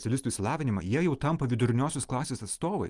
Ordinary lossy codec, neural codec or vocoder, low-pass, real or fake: Opus, 32 kbps; none; 10.8 kHz; real